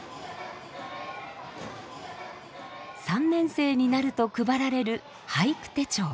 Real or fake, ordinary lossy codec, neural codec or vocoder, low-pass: real; none; none; none